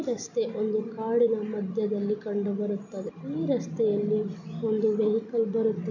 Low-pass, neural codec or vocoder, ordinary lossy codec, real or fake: 7.2 kHz; none; none; real